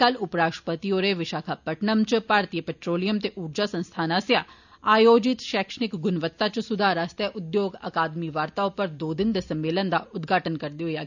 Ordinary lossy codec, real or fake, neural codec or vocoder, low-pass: none; real; none; 7.2 kHz